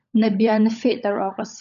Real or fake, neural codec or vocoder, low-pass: fake; codec, 16 kHz, 16 kbps, FunCodec, trained on LibriTTS, 50 frames a second; 7.2 kHz